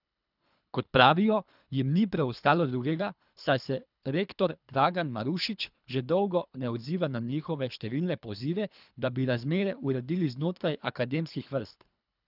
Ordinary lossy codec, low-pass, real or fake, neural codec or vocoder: none; 5.4 kHz; fake; codec, 24 kHz, 3 kbps, HILCodec